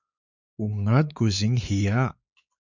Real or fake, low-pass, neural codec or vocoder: fake; 7.2 kHz; codec, 16 kHz, 4 kbps, X-Codec, WavLM features, trained on Multilingual LibriSpeech